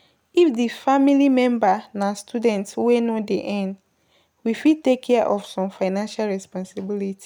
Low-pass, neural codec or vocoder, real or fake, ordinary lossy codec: 19.8 kHz; none; real; none